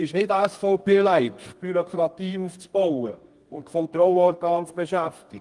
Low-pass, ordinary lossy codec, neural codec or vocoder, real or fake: 10.8 kHz; Opus, 24 kbps; codec, 24 kHz, 0.9 kbps, WavTokenizer, medium music audio release; fake